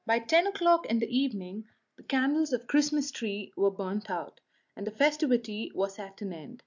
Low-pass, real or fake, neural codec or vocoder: 7.2 kHz; real; none